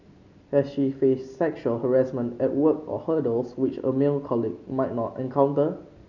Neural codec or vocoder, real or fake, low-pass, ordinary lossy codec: none; real; 7.2 kHz; none